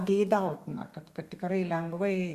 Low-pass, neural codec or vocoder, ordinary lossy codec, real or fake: 14.4 kHz; codec, 32 kHz, 1.9 kbps, SNAC; Opus, 64 kbps; fake